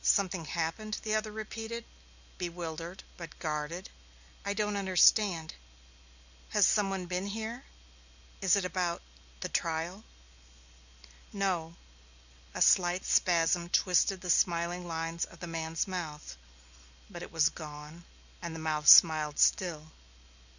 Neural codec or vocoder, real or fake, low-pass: none; real; 7.2 kHz